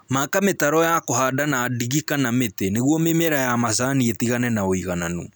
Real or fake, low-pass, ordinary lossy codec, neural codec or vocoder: real; none; none; none